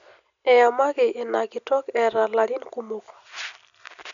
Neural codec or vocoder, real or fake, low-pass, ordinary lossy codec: none; real; 7.2 kHz; none